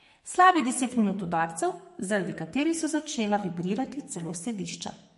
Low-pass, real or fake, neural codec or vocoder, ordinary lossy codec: 14.4 kHz; fake; codec, 44.1 kHz, 2.6 kbps, SNAC; MP3, 48 kbps